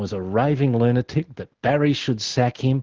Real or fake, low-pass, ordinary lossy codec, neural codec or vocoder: real; 7.2 kHz; Opus, 16 kbps; none